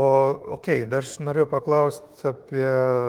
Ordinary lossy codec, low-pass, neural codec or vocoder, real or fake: Opus, 24 kbps; 19.8 kHz; autoencoder, 48 kHz, 32 numbers a frame, DAC-VAE, trained on Japanese speech; fake